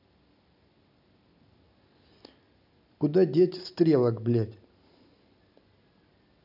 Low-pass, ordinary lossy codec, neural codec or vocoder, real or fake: 5.4 kHz; none; none; real